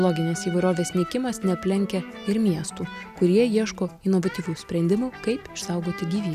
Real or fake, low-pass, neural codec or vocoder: real; 14.4 kHz; none